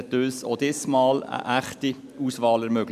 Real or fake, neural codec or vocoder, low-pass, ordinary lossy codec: real; none; 14.4 kHz; none